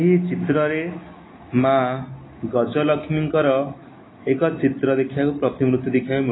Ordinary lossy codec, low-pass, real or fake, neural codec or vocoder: AAC, 16 kbps; 7.2 kHz; real; none